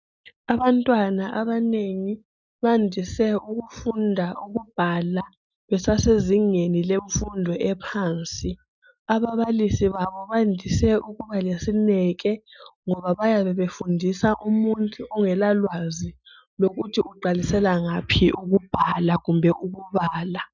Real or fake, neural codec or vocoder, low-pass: real; none; 7.2 kHz